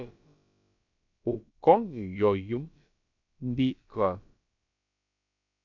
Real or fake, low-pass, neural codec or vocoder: fake; 7.2 kHz; codec, 16 kHz, about 1 kbps, DyCAST, with the encoder's durations